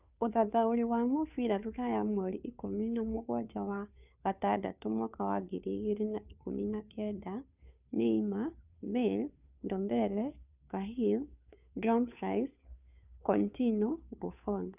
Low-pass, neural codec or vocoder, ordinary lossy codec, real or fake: 3.6 kHz; codec, 16 kHz, 2 kbps, FunCodec, trained on Chinese and English, 25 frames a second; none; fake